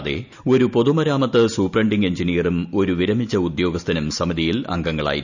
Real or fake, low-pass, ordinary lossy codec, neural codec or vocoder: real; 7.2 kHz; none; none